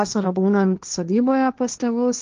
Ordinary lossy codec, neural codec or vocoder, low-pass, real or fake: Opus, 24 kbps; codec, 16 kHz, 1.1 kbps, Voila-Tokenizer; 7.2 kHz; fake